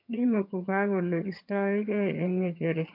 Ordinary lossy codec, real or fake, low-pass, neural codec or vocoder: MP3, 48 kbps; fake; 5.4 kHz; vocoder, 22.05 kHz, 80 mel bands, HiFi-GAN